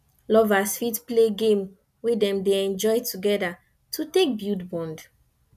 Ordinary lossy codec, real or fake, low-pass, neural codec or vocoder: none; real; 14.4 kHz; none